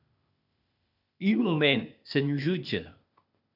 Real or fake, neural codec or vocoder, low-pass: fake; codec, 16 kHz, 0.8 kbps, ZipCodec; 5.4 kHz